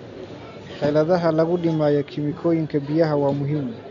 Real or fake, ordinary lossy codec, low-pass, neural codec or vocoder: real; none; 7.2 kHz; none